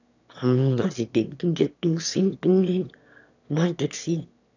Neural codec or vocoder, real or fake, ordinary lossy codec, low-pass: autoencoder, 22.05 kHz, a latent of 192 numbers a frame, VITS, trained on one speaker; fake; none; 7.2 kHz